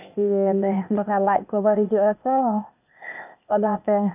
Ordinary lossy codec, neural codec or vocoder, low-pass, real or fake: none; codec, 16 kHz, 0.8 kbps, ZipCodec; 3.6 kHz; fake